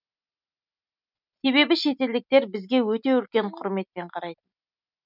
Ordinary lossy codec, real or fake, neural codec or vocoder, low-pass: none; real; none; 5.4 kHz